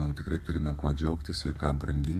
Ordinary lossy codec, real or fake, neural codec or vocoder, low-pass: MP3, 64 kbps; fake; codec, 32 kHz, 1.9 kbps, SNAC; 14.4 kHz